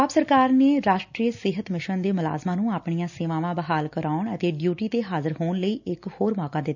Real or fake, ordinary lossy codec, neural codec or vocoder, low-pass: real; none; none; 7.2 kHz